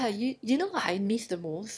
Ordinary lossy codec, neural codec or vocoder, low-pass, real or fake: none; autoencoder, 22.05 kHz, a latent of 192 numbers a frame, VITS, trained on one speaker; none; fake